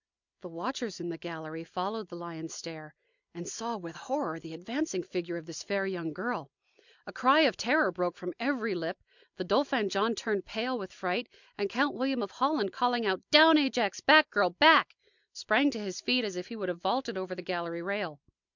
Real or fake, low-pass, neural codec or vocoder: real; 7.2 kHz; none